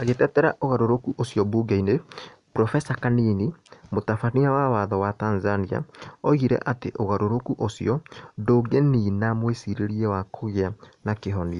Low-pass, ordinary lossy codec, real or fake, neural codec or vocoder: 10.8 kHz; none; real; none